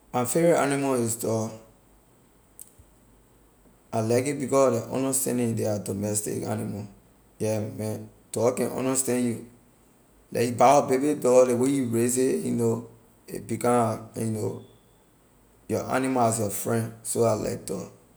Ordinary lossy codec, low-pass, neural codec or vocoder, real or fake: none; none; none; real